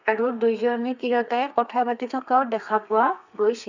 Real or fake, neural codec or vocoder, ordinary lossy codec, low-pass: fake; codec, 32 kHz, 1.9 kbps, SNAC; none; 7.2 kHz